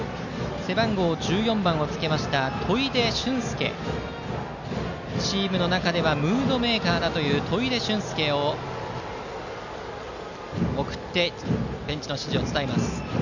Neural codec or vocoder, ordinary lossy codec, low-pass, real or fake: none; none; 7.2 kHz; real